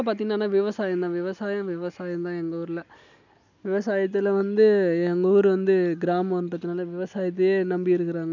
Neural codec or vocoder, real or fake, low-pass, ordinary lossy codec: none; real; 7.2 kHz; none